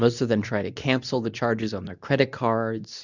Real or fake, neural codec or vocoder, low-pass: fake; codec, 24 kHz, 0.9 kbps, WavTokenizer, medium speech release version 2; 7.2 kHz